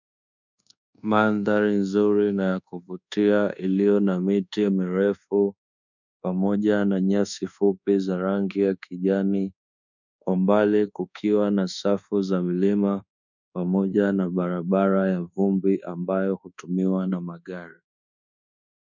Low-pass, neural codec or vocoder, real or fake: 7.2 kHz; codec, 24 kHz, 1.2 kbps, DualCodec; fake